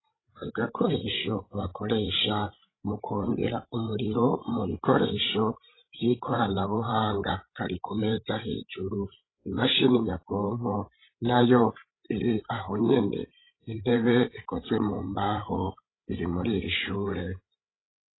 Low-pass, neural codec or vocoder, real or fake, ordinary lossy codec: 7.2 kHz; codec, 16 kHz, 16 kbps, FreqCodec, larger model; fake; AAC, 16 kbps